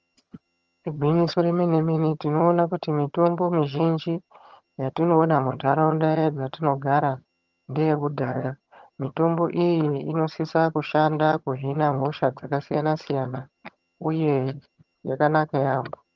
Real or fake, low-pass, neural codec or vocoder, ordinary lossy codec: fake; 7.2 kHz; vocoder, 22.05 kHz, 80 mel bands, HiFi-GAN; Opus, 24 kbps